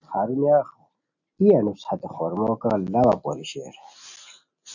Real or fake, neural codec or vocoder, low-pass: real; none; 7.2 kHz